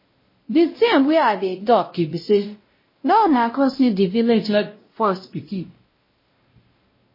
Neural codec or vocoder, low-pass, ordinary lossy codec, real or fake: codec, 16 kHz, 0.5 kbps, X-Codec, WavLM features, trained on Multilingual LibriSpeech; 5.4 kHz; MP3, 24 kbps; fake